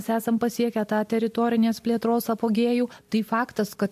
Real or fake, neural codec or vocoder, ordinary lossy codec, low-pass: real; none; MP3, 64 kbps; 14.4 kHz